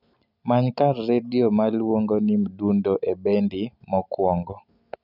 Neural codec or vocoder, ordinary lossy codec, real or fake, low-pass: none; none; real; 5.4 kHz